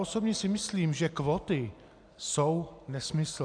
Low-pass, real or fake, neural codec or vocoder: 9.9 kHz; real; none